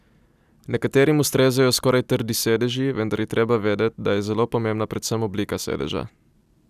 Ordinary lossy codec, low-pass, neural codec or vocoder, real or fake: none; 14.4 kHz; none; real